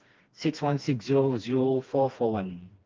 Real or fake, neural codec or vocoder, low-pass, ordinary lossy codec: fake; codec, 16 kHz, 2 kbps, FreqCodec, smaller model; 7.2 kHz; Opus, 32 kbps